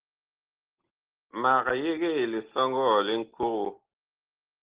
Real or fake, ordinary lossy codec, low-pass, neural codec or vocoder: real; Opus, 16 kbps; 3.6 kHz; none